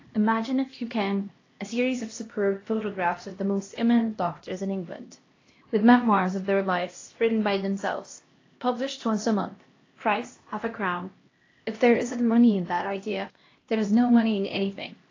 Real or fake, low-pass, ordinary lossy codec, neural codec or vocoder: fake; 7.2 kHz; AAC, 32 kbps; codec, 16 kHz, 1 kbps, X-Codec, HuBERT features, trained on LibriSpeech